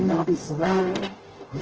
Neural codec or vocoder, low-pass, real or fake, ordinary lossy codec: codec, 44.1 kHz, 0.9 kbps, DAC; 7.2 kHz; fake; Opus, 16 kbps